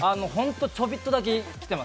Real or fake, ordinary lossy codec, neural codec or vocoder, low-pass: real; none; none; none